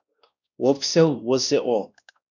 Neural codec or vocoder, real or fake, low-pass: codec, 16 kHz, 1 kbps, X-Codec, WavLM features, trained on Multilingual LibriSpeech; fake; 7.2 kHz